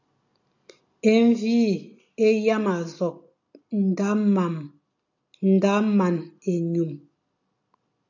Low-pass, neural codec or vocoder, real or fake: 7.2 kHz; none; real